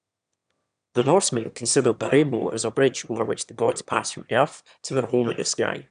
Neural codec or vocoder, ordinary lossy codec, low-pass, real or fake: autoencoder, 22.05 kHz, a latent of 192 numbers a frame, VITS, trained on one speaker; none; 9.9 kHz; fake